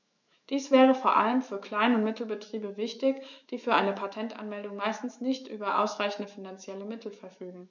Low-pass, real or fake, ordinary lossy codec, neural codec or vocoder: 7.2 kHz; fake; none; autoencoder, 48 kHz, 128 numbers a frame, DAC-VAE, trained on Japanese speech